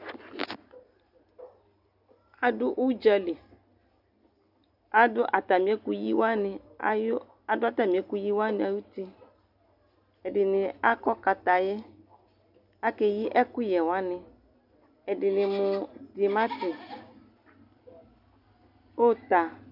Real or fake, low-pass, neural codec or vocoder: real; 5.4 kHz; none